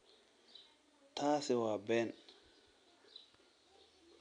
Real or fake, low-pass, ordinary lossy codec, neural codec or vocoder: real; 9.9 kHz; none; none